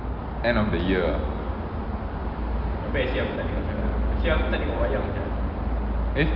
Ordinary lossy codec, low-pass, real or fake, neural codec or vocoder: Opus, 32 kbps; 5.4 kHz; real; none